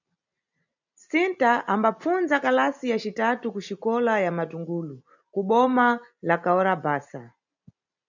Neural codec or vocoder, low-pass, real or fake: none; 7.2 kHz; real